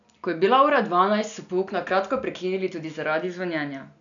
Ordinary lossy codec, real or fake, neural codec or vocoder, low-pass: none; real; none; 7.2 kHz